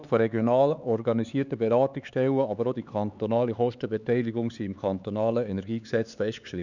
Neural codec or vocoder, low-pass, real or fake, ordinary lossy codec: codec, 16 kHz, 4 kbps, X-Codec, HuBERT features, trained on LibriSpeech; 7.2 kHz; fake; none